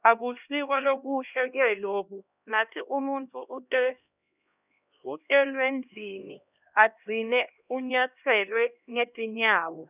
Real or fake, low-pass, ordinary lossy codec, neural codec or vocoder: fake; 3.6 kHz; none; codec, 16 kHz, 1 kbps, X-Codec, HuBERT features, trained on LibriSpeech